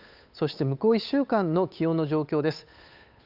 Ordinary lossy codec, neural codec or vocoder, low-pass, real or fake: none; codec, 16 kHz, 8 kbps, FunCodec, trained on Chinese and English, 25 frames a second; 5.4 kHz; fake